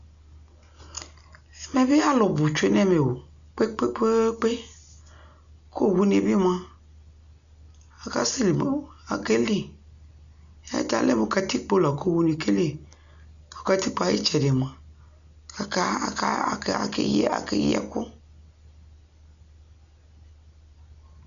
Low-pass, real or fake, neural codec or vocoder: 7.2 kHz; real; none